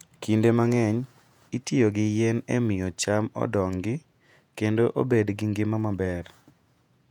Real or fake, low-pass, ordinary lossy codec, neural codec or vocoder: fake; 19.8 kHz; none; vocoder, 48 kHz, 128 mel bands, Vocos